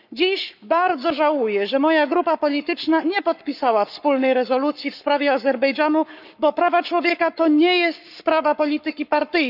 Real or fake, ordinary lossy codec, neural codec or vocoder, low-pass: fake; none; codec, 44.1 kHz, 7.8 kbps, Pupu-Codec; 5.4 kHz